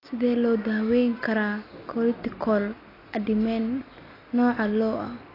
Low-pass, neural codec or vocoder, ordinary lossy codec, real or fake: 5.4 kHz; none; AAC, 24 kbps; real